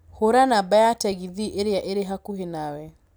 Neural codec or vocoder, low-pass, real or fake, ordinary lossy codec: none; none; real; none